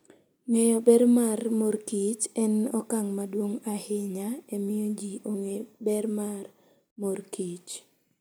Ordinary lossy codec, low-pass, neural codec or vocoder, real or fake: none; none; none; real